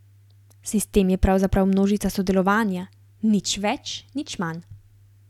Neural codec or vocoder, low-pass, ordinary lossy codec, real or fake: none; 19.8 kHz; none; real